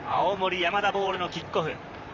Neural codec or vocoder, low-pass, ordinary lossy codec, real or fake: vocoder, 44.1 kHz, 128 mel bands, Pupu-Vocoder; 7.2 kHz; none; fake